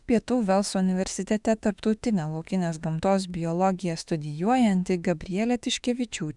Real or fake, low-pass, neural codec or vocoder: fake; 10.8 kHz; autoencoder, 48 kHz, 32 numbers a frame, DAC-VAE, trained on Japanese speech